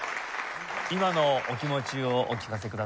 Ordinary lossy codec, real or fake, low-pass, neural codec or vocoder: none; real; none; none